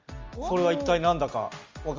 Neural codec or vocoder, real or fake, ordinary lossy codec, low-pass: none; real; Opus, 32 kbps; 7.2 kHz